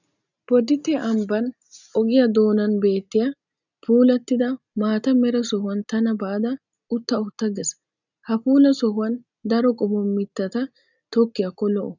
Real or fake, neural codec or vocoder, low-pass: real; none; 7.2 kHz